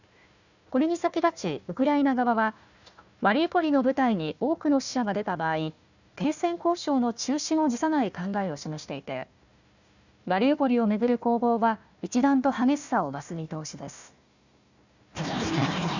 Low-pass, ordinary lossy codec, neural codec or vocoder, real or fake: 7.2 kHz; none; codec, 16 kHz, 1 kbps, FunCodec, trained on Chinese and English, 50 frames a second; fake